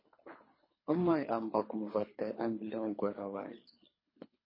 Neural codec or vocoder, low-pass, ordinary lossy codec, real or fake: codec, 24 kHz, 3 kbps, HILCodec; 5.4 kHz; MP3, 24 kbps; fake